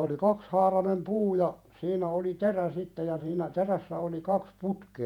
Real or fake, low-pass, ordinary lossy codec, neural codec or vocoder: fake; 19.8 kHz; none; vocoder, 48 kHz, 128 mel bands, Vocos